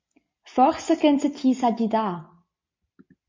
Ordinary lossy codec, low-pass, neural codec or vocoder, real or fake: MP3, 32 kbps; 7.2 kHz; none; real